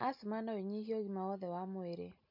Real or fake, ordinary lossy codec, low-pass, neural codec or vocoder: real; MP3, 48 kbps; 5.4 kHz; none